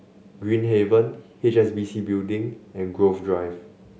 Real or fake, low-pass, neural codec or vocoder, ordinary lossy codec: real; none; none; none